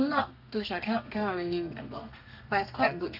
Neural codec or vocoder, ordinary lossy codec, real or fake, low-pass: codec, 32 kHz, 1.9 kbps, SNAC; none; fake; 5.4 kHz